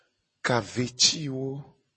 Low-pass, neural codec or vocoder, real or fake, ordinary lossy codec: 10.8 kHz; none; real; MP3, 32 kbps